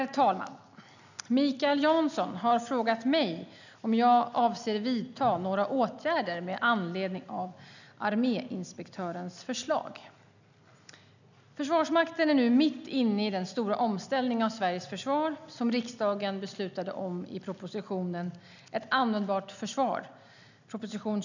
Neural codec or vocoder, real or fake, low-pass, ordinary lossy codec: none; real; 7.2 kHz; none